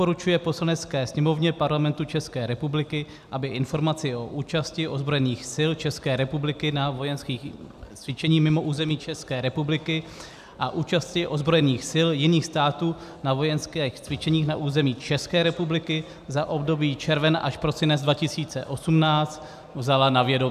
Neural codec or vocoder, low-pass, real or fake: none; 14.4 kHz; real